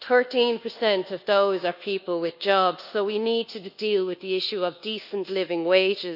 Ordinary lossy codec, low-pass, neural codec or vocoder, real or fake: none; 5.4 kHz; codec, 24 kHz, 1.2 kbps, DualCodec; fake